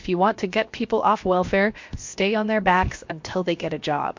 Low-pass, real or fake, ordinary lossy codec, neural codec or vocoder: 7.2 kHz; fake; MP3, 48 kbps; codec, 16 kHz, 0.7 kbps, FocalCodec